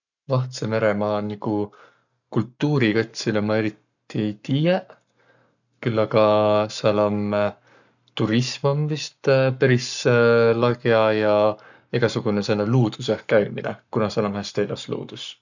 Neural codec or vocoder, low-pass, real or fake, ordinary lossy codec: codec, 44.1 kHz, 7.8 kbps, Pupu-Codec; 7.2 kHz; fake; none